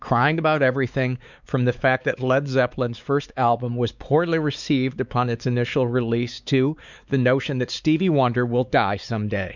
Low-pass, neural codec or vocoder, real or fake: 7.2 kHz; codec, 16 kHz, 4 kbps, X-Codec, WavLM features, trained on Multilingual LibriSpeech; fake